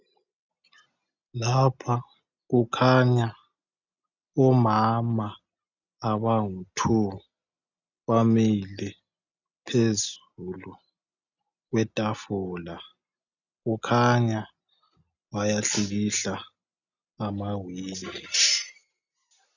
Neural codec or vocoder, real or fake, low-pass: none; real; 7.2 kHz